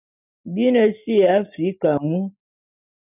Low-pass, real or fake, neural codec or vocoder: 3.6 kHz; real; none